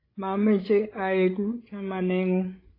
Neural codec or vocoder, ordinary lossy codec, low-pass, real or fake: codec, 16 kHz, 16 kbps, FreqCodec, larger model; AAC, 24 kbps; 5.4 kHz; fake